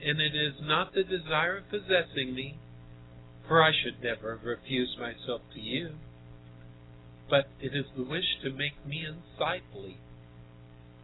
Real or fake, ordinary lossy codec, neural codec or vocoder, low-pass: real; AAC, 16 kbps; none; 7.2 kHz